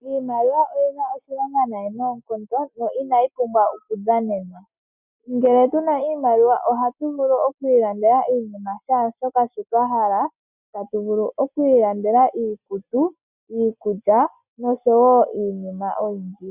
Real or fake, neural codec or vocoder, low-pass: real; none; 3.6 kHz